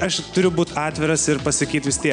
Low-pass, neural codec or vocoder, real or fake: 9.9 kHz; none; real